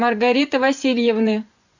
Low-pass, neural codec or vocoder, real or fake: 7.2 kHz; vocoder, 24 kHz, 100 mel bands, Vocos; fake